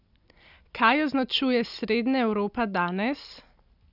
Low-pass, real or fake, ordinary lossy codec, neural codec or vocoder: 5.4 kHz; real; none; none